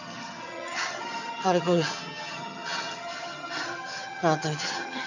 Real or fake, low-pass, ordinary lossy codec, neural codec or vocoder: fake; 7.2 kHz; none; vocoder, 22.05 kHz, 80 mel bands, HiFi-GAN